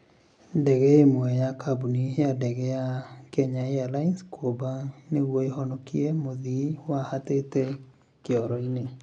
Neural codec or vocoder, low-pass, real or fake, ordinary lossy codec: none; 9.9 kHz; real; none